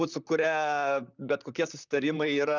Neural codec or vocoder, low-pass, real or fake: vocoder, 44.1 kHz, 128 mel bands, Pupu-Vocoder; 7.2 kHz; fake